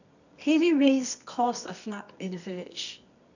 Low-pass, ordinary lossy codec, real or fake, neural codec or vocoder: 7.2 kHz; none; fake; codec, 24 kHz, 0.9 kbps, WavTokenizer, medium music audio release